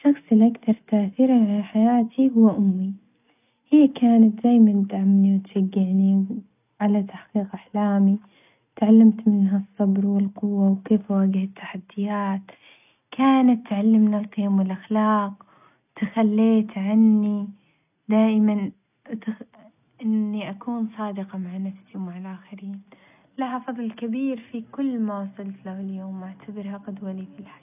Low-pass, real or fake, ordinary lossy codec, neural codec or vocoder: 3.6 kHz; real; none; none